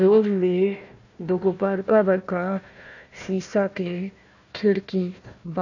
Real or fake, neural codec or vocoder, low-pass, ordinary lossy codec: fake; codec, 16 kHz, 1 kbps, FunCodec, trained on Chinese and English, 50 frames a second; 7.2 kHz; none